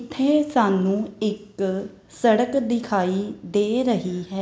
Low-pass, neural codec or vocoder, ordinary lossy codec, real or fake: none; none; none; real